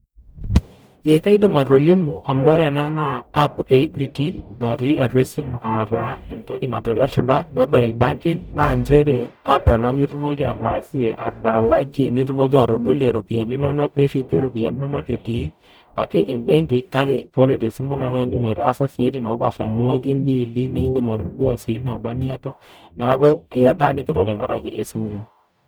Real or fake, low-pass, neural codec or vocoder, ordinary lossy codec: fake; none; codec, 44.1 kHz, 0.9 kbps, DAC; none